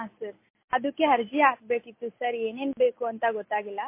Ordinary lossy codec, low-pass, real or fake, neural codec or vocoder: MP3, 24 kbps; 3.6 kHz; real; none